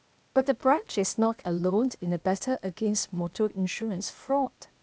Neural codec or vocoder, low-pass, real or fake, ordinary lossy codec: codec, 16 kHz, 0.8 kbps, ZipCodec; none; fake; none